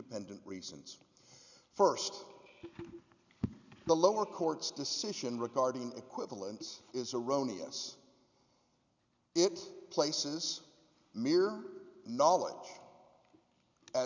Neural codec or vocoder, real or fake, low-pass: none; real; 7.2 kHz